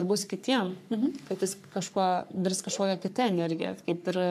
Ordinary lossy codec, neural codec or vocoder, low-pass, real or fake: MP3, 96 kbps; codec, 44.1 kHz, 3.4 kbps, Pupu-Codec; 14.4 kHz; fake